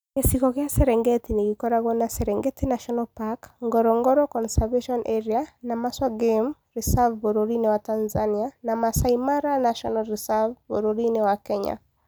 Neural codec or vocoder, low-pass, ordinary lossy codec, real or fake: none; none; none; real